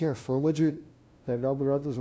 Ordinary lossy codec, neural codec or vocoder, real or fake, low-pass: none; codec, 16 kHz, 0.5 kbps, FunCodec, trained on LibriTTS, 25 frames a second; fake; none